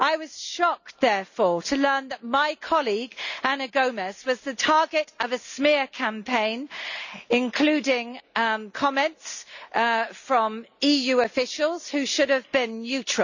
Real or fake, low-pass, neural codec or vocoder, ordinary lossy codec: real; 7.2 kHz; none; none